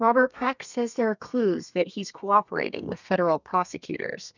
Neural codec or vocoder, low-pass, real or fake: codec, 32 kHz, 1.9 kbps, SNAC; 7.2 kHz; fake